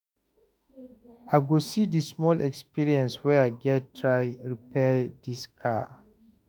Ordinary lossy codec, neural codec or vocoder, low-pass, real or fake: none; autoencoder, 48 kHz, 32 numbers a frame, DAC-VAE, trained on Japanese speech; none; fake